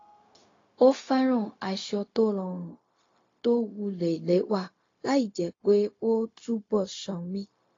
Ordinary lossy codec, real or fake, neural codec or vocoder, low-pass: AAC, 32 kbps; fake; codec, 16 kHz, 0.4 kbps, LongCat-Audio-Codec; 7.2 kHz